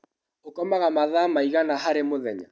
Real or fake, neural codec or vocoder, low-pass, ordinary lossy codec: real; none; none; none